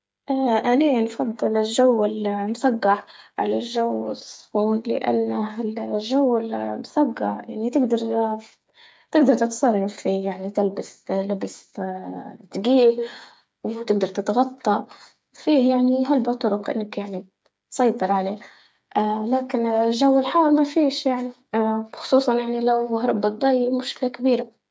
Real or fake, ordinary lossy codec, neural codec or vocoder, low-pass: fake; none; codec, 16 kHz, 8 kbps, FreqCodec, smaller model; none